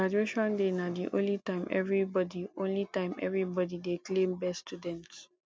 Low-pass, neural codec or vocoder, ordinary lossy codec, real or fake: none; none; none; real